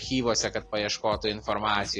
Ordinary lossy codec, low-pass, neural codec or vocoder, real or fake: AAC, 32 kbps; 10.8 kHz; none; real